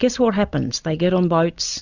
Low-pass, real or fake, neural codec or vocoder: 7.2 kHz; real; none